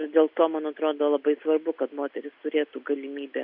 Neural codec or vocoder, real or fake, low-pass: none; real; 5.4 kHz